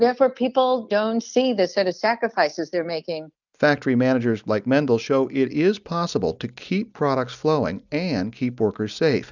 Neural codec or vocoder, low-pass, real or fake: none; 7.2 kHz; real